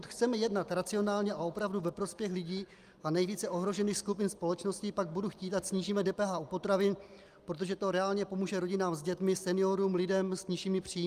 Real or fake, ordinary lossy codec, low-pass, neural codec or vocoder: real; Opus, 32 kbps; 14.4 kHz; none